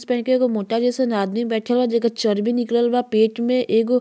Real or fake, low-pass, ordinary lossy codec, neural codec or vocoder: real; none; none; none